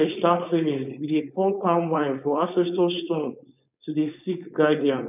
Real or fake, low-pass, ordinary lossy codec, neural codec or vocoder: fake; 3.6 kHz; none; codec, 16 kHz, 4.8 kbps, FACodec